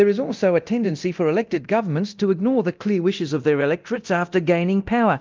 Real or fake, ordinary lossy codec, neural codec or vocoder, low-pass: fake; Opus, 24 kbps; codec, 24 kHz, 0.9 kbps, DualCodec; 7.2 kHz